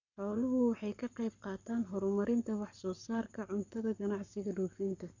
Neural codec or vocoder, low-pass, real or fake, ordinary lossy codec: codec, 44.1 kHz, 7.8 kbps, Pupu-Codec; 7.2 kHz; fake; none